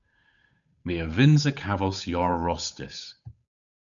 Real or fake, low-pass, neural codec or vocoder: fake; 7.2 kHz; codec, 16 kHz, 8 kbps, FunCodec, trained on Chinese and English, 25 frames a second